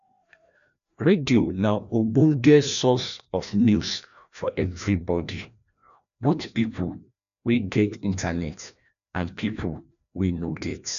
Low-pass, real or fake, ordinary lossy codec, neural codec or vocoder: 7.2 kHz; fake; none; codec, 16 kHz, 1 kbps, FreqCodec, larger model